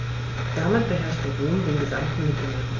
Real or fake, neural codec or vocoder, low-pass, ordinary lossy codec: real; none; 7.2 kHz; AAC, 32 kbps